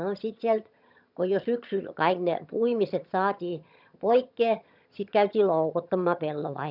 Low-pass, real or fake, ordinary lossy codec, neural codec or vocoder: 5.4 kHz; fake; none; vocoder, 22.05 kHz, 80 mel bands, HiFi-GAN